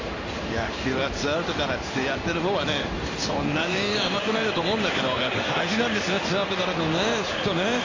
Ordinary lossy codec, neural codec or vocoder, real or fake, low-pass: AAC, 48 kbps; codec, 16 kHz in and 24 kHz out, 1 kbps, XY-Tokenizer; fake; 7.2 kHz